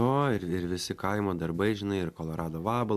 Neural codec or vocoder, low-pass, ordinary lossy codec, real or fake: none; 14.4 kHz; MP3, 96 kbps; real